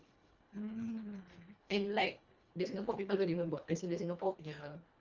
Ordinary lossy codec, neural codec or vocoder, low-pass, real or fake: Opus, 32 kbps; codec, 24 kHz, 1.5 kbps, HILCodec; 7.2 kHz; fake